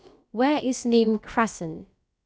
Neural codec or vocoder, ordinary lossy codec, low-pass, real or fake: codec, 16 kHz, about 1 kbps, DyCAST, with the encoder's durations; none; none; fake